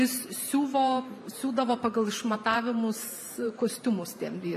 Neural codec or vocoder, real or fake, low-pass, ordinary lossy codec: vocoder, 44.1 kHz, 128 mel bands every 512 samples, BigVGAN v2; fake; 14.4 kHz; AAC, 96 kbps